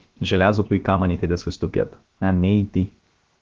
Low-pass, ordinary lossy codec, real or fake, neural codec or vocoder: 7.2 kHz; Opus, 16 kbps; fake; codec, 16 kHz, about 1 kbps, DyCAST, with the encoder's durations